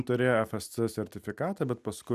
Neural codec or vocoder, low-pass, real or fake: none; 14.4 kHz; real